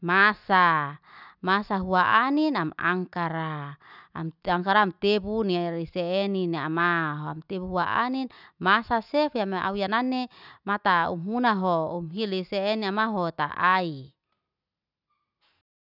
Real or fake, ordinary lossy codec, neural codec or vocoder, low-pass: real; none; none; 5.4 kHz